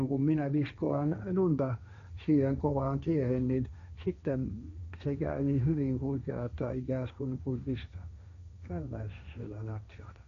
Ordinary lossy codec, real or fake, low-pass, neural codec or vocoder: none; fake; 7.2 kHz; codec, 16 kHz, 1.1 kbps, Voila-Tokenizer